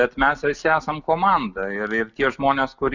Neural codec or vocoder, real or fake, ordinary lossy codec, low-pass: none; real; Opus, 64 kbps; 7.2 kHz